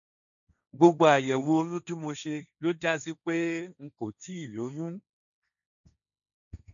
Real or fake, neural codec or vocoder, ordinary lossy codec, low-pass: fake; codec, 16 kHz, 1.1 kbps, Voila-Tokenizer; none; 7.2 kHz